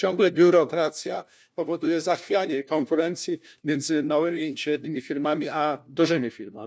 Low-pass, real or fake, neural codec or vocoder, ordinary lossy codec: none; fake; codec, 16 kHz, 1 kbps, FunCodec, trained on LibriTTS, 50 frames a second; none